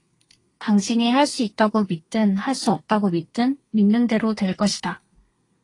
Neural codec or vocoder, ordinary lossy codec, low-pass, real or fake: codec, 32 kHz, 1.9 kbps, SNAC; AAC, 32 kbps; 10.8 kHz; fake